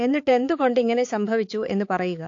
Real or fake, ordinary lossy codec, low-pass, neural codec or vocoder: fake; AAC, 48 kbps; 7.2 kHz; codec, 16 kHz, 4 kbps, X-Codec, HuBERT features, trained on balanced general audio